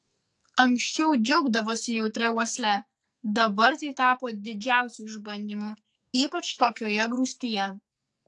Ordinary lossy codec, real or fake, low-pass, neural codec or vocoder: AAC, 64 kbps; fake; 10.8 kHz; codec, 44.1 kHz, 2.6 kbps, SNAC